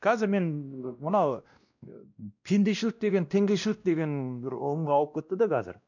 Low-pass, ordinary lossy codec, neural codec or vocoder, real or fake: 7.2 kHz; none; codec, 16 kHz, 1 kbps, X-Codec, WavLM features, trained on Multilingual LibriSpeech; fake